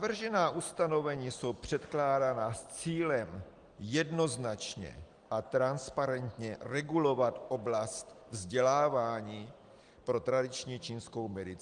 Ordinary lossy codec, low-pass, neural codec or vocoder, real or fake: Opus, 24 kbps; 9.9 kHz; none; real